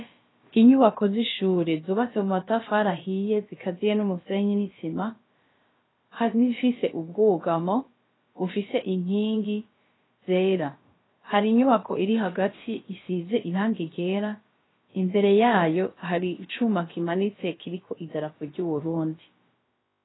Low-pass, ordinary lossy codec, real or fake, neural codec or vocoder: 7.2 kHz; AAC, 16 kbps; fake; codec, 16 kHz, about 1 kbps, DyCAST, with the encoder's durations